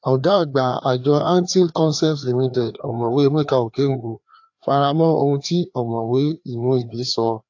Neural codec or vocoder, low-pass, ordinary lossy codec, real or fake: codec, 16 kHz, 2 kbps, FreqCodec, larger model; 7.2 kHz; AAC, 48 kbps; fake